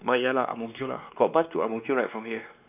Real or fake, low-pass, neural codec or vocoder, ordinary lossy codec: fake; 3.6 kHz; codec, 16 kHz, 2 kbps, X-Codec, WavLM features, trained on Multilingual LibriSpeech; none